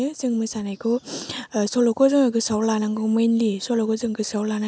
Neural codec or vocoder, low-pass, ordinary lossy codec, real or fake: none; none; none; real